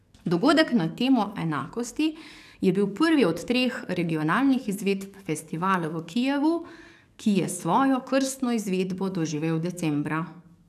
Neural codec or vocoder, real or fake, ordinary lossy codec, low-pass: codec, 44.1 kHz, 7.8 kbps, DAC; fake; none; 14.4 kHz